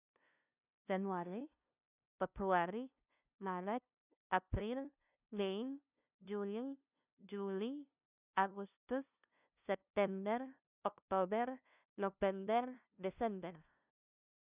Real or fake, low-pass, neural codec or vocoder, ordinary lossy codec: fake; 3.6 kHz; codec, 16 kHz, 0.5 kbps, FunCodec, trained on LibriTTS, 25 frames a second; none